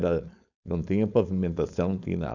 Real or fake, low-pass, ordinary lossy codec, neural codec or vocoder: fake; 7.2 kHz; none; codec, 16 kHz, 4.8 kbps, FACodec